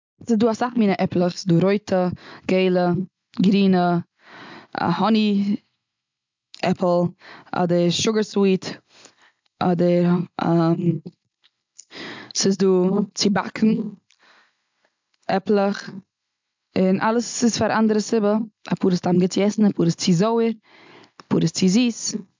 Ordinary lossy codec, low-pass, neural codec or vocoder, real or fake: MP3, 64 kbps; 7.2 kHz; none; real